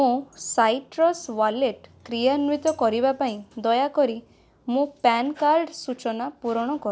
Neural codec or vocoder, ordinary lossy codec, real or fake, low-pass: none; none; real; none